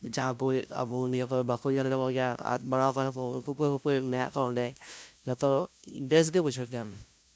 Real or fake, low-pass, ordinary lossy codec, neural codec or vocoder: fake; none; none; codec, 16 kHz, 0.5 kbps, FunCodec, trained on LibriTTS, 25 frames a second